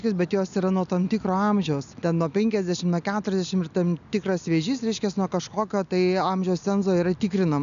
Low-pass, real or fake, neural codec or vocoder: 7.2 kHz; real; none